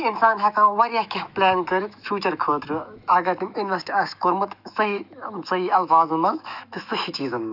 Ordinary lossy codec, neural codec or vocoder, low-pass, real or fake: none; autoencoder, 48 kHz, 128 numbers a frame, DAC-VAE, trained on Japanese speech; 5.4 kHz; fake